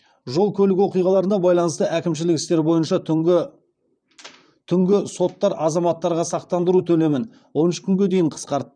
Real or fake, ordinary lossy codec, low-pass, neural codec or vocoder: fake; none; 9.9 kHz; vocoder, 44.1 kHz, 128 mel bands, Pupu-Vocoder